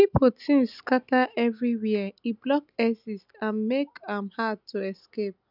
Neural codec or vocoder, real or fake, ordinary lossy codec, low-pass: none; real; none; 5.4 kHz